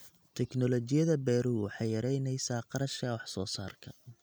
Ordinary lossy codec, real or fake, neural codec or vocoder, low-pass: none; real; none; none